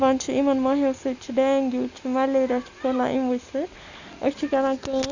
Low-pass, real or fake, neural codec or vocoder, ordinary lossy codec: 7.2 kHz; real; none; Opus, 64 kbps